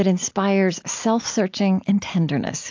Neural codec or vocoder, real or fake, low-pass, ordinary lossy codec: none; real; 7.2 kHz; AAC, 48 kbps